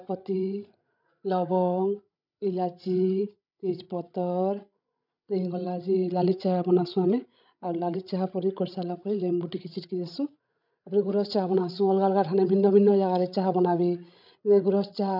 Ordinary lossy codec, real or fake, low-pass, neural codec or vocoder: none; fake; 5.4 kHz; codec, 16 kHz, 16 kbps, FreqCodec, larger model